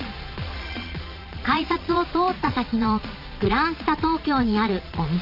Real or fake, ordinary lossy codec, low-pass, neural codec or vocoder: fake; none; 5.4 kHz; vocoder, 22.05 kHz, 80 mel bands, Vocos